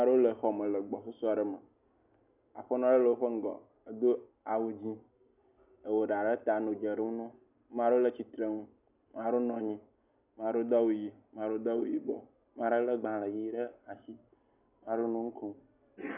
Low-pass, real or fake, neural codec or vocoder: 3.6 kHz; real; none